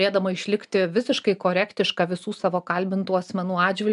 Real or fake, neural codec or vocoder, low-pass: real; none; 10.8 kHz